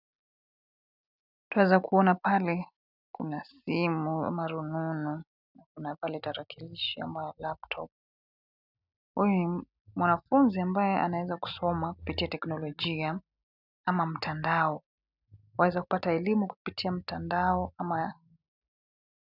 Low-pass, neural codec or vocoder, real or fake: 5.4 kHz; none; real